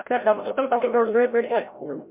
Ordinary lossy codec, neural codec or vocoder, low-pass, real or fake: MP3, 32 kbps; codec, 16 kHz, 0.5 kbps, FreqCodec, larger model; 3.6 kHz; fake